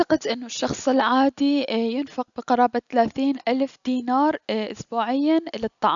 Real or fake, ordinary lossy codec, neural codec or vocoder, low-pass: real; none; none; 7.2 kHz